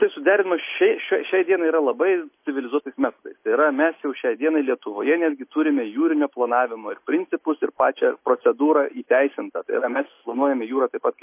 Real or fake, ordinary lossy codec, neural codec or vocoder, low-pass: real; MP3, 24 kbps; none; 3.6 kHz